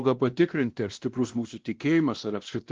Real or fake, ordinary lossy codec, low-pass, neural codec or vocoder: fake; Opus, 16 kbps; 7.2 kHz; codec, 16 kHz, 1 kbps, X-Codec, WavLM features, trained on Multilingual LibriSpeech